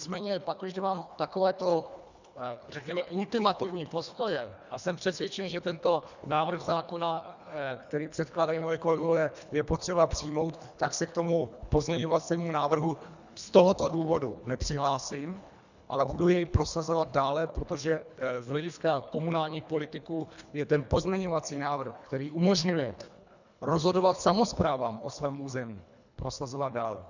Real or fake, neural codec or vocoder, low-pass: fake; codec, 24 kHz, 1.5 kbps, HILCodec; 7.2 kHz